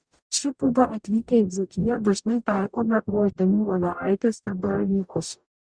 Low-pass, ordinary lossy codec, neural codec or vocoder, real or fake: 9.9 kHz; Opus, 64 kbps; codec, 44.1 kHz, 0.9 kbps, DAC; fake